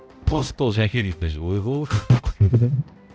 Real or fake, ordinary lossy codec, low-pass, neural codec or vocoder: fake; none; none; codec, 16 kHz, 0.5 kbps, X-Codec, HuBERT features, trained on balanced general audio